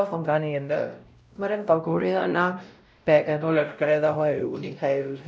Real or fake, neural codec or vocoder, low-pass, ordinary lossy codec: fake; codec, 16 kHz, 0.5 kbps, X-Codec, WavLM features, trained on Multilingual LibriSpeech; none; none